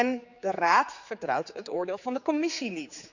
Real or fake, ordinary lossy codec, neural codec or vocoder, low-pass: fake; none; codec, 16 kHz, 4 kbps, X-Codec, HuBERT features, trained on general audio; 7.2 kHz